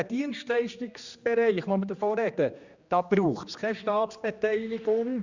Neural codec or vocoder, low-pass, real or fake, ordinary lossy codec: codec, 16 kHz, 2 kbps, X-Codec, HuBERT features, trained on general audio; 7.2 kHz; fake; Opus, 64 kbps